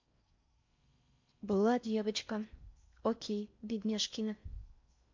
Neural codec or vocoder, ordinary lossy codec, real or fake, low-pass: codec, 16 kHz in and 24 kHz out, 0.6 kbps, FocalCodec, streaming, 4096 codes; MP3, 64 kbps; fake; 7.2 kHz